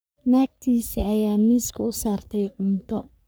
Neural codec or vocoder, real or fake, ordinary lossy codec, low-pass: codec, 44.1 kHz, 3.4 kbps, Pupu-Codec; fake; none; none